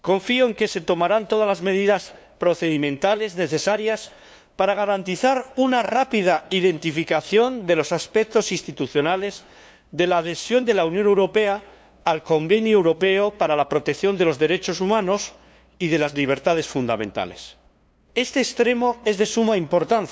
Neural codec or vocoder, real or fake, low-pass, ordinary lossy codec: codec, 16 kHz, 2 kbps, FunCodec, trained on LibriTTS, 25 frames a second; fake; none; none